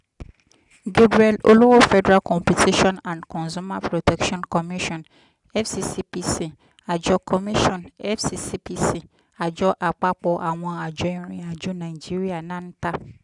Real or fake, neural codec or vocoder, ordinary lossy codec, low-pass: real; none; none; 10.8 kHz